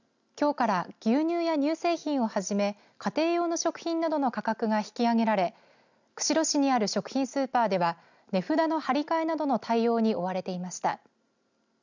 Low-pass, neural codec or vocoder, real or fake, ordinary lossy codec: 7.2 kHz; none; real; none